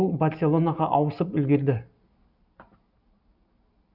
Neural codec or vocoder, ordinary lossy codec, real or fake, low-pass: none; none; real; 5.4 kHz